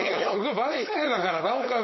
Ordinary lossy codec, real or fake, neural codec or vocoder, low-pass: MP3, 24 kbps; fake; codec, 16 kHz, 4.8 kbps, FACodec; 7.2 kHz